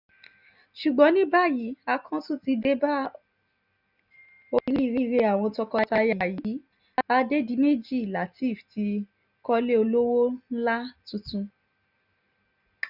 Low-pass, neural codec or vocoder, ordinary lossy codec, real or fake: 5.4 kHz; none; none; real